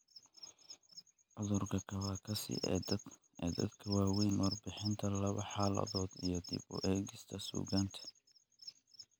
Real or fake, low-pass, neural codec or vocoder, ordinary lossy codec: real; none; none; none